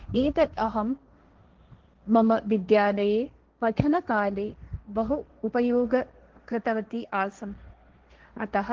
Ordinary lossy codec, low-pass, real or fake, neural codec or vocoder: Opus, 16 kbps; 7.2 kHz; fake; codec, 16 kHz, 1.1 kbps, Voila-Tokenizer